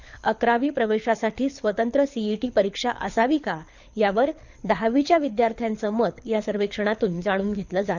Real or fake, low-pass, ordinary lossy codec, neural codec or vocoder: fake; 7.2 kHz; none; codec, 24 kHz, 6 kbps, HILCodec